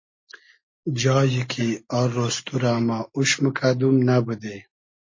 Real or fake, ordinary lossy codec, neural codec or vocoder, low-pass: real; MP3, 32 kbps; none; 7.2 kHz